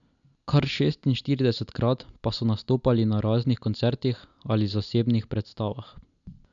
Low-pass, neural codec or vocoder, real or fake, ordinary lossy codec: 7.2 kHz; none; real; none